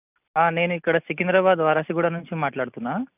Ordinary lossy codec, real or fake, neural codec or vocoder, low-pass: none; real; none; 3.6 kHz